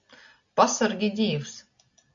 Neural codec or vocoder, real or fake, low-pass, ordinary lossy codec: none; real; 7.2 kHz; AAC, 64 kbps